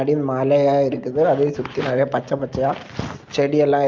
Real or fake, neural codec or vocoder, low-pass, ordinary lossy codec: real; none; 7.2 kHz; Opus, 32 kbps